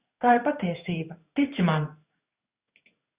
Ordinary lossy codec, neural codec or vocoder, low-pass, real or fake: Opus, 64 kbps; codec, 16 kHz in and 24 kHz out, 1 kbps, XY-Tokenizer; 3.6 kHz; fake